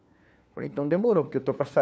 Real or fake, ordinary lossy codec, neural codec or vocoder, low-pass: fake; none; codec, 16 kHz, 4 kbps, FunCodec, trained on LibriTTS, 50 frames a second; none